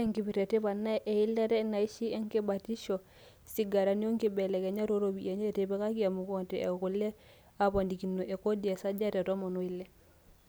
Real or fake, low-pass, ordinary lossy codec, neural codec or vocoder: real; none; none; none